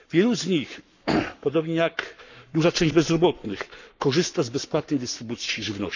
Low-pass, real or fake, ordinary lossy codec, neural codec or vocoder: 7.2 kHz; fake; none; codec, 44.1 kHz, 7.8 kbps, Pupu-Codec